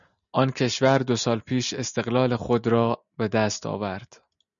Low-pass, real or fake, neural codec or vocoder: 7.2 kHz; real; none